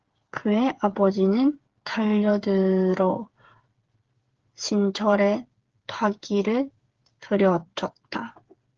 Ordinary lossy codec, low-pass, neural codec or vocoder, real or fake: Opus, 16 kbps; 7.2 kHz; codec, 16 kHz, 8 kbps, FreqCodec, smaller model; fake